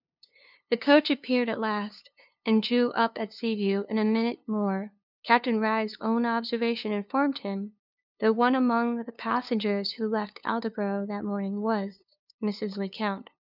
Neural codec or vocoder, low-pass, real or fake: codec, 16 kHz, 2 kbps, FunCodec, trained on LibriTTS, 25 frames a second; 5.4 kHz; fake